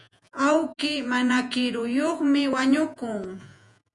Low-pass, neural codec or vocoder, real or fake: 10.8 kHz; vocoder, 48 kHz, 128 mel bands, Vocos; fake